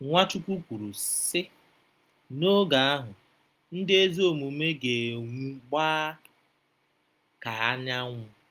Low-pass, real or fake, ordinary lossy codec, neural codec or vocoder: 14.4 kHz; real; Opus, 32 kbps; none